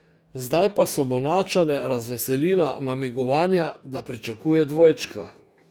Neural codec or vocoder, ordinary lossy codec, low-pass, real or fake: codec, 44.1 kHz, 2.6 kbps, DAC; none; none; fake